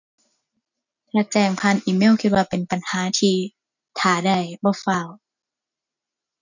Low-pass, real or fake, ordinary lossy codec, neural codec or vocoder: 7.2 kHz; real; none; none